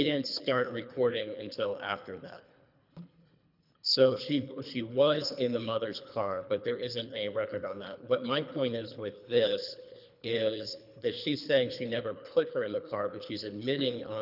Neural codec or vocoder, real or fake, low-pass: codec, 24 kHz, 3 kbps, HILCodec; fake; 5.4 kHz